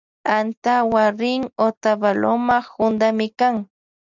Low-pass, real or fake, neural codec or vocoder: 7.2 kHz; real; none